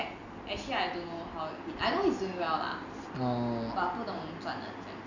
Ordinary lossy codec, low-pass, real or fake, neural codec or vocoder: none; 7.2 kHz; real; none